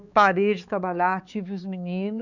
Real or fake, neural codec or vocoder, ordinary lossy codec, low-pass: fake; codec, 16 kHz, 4 kbps, X-Codec, HuBERT features, trained on balanced general audio; none; 7.2 kHz